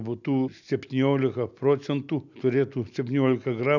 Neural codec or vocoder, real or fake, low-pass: none; real; 7.2 kHz